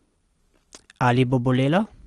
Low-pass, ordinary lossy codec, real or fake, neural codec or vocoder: 10.8 kHz; Opus, 24 kbps; real; none